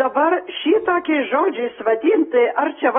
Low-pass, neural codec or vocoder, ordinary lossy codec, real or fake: 10.8 kHz; none; AAC, 16 kbps; real